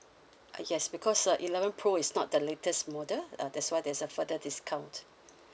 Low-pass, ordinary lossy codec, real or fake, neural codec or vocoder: none; none; real; none